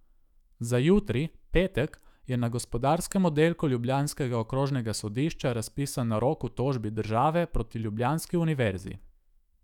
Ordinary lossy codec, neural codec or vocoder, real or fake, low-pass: none; autoencoder, 48 kHz, 128 numbers a frame, DAC-VAE, trained on Japanese speech; fake; 19.8 kHz